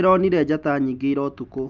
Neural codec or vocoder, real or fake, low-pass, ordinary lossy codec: none; real; 7.2 kHz; Opus, 24 kbps